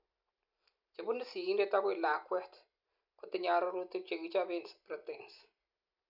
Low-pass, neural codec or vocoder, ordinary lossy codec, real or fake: 5.4 kHz; none; none; real